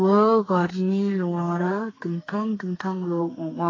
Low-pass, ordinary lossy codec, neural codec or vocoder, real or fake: 7.2 kHz; AAC, 32 kbps; codec, 32 kHz, 1.9 kbps, SNAC; fake